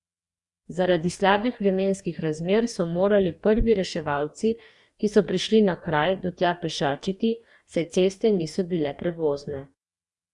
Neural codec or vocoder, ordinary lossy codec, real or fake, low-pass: codec, 44.1 kHz, 2.6 kbps, DAC; Opus, 64 kbps; fake; 10.8 kHz